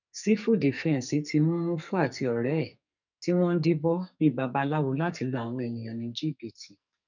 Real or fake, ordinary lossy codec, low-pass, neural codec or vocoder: fake; none; 7.2 kHz; codec, 44.1 kHz, 2.6 kbps, SNAC